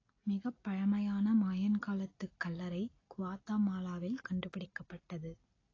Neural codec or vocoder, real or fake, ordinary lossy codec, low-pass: none; real; AAC, 32 kbps; 7.2 kHz